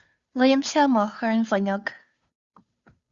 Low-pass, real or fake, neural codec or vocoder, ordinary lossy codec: 7.2 kHz; fake; codec, 16 kHz, 2 kbps, FunCodec, trained on Chinese and English, 25 frames a second; Opus, 64 kbps